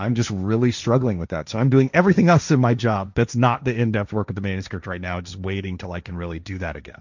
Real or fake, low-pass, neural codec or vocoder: fake; 7.2 kHz; codec, 16 kHz, 1.1 kbps, Voila-Tokenizer